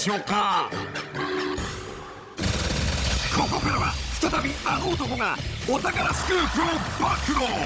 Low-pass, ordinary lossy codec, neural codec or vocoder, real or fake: none; none; codec, 16 kHz, 16 kbps, FunCodec, trained on Chinese and English, 50 frames a second; fake